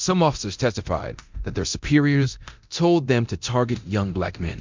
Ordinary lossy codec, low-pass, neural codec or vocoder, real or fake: MP3, 64 kbps; 7.2 kHz; codec, 24 kHz, 0.9 kbps, DualCodec; fake